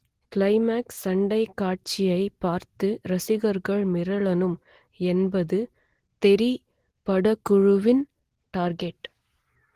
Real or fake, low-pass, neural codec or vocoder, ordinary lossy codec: real; 14.4 kHz; none; Opus, 16 kbps